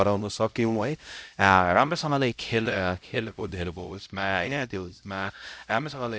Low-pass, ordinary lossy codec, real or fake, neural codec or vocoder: none; none; fake; codec, 16 kHz, 0.5 kbps, X-Codec, HuBERT features, trained on LibriSpeech